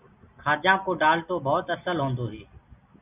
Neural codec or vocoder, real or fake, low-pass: none; real; 3.6 kHz